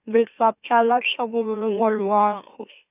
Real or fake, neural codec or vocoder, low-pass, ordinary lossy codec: fake; autoencoder, 44.1 kHz, a latent of 192 numbers a frame, MeloTTS; 3.6 kHz; none